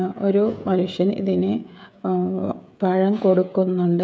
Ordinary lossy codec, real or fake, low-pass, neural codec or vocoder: none; fake; none; codec, 16 kHz, 16 kbps, FreqCodec, smaller model